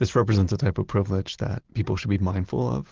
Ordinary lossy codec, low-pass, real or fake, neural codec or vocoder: Opus, 16 kbps; 7.2 kHz; real; none